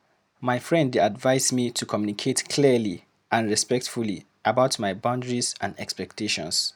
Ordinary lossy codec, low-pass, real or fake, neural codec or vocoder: none; none; real; none